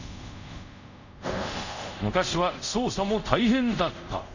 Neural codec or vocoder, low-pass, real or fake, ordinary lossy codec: codec, 24 kHz, 0.5 kbps, DualCodec; 7.2 kHz; fake; none